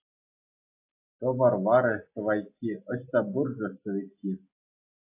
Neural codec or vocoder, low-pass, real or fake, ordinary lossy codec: none; 3.6 kHz; real; AAC, 32 kbps